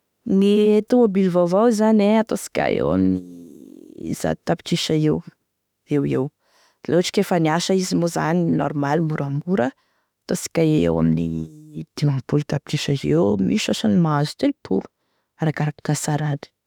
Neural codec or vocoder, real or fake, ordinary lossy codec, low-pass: autoencoder, 48 kHz, 32 numbers a frame, DAC-VAE, trained on Japanese speech; fake; none; 19.8 kHz